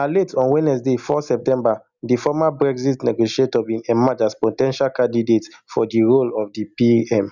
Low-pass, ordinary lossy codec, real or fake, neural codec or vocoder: 7.2 kHz; none; real; none